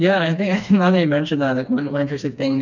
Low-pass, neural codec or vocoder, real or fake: 7.2 kHz; codec, 16 kHz, 2 kbps, FreqCodec, smaller model; fake